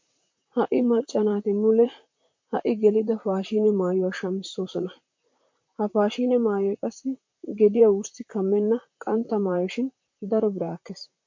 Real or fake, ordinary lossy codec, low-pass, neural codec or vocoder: fake; MP3, 48 kbps; 7.2 kHz; vocoder, 44.1 kHz, 128 mel bands, Pupu-Vocoder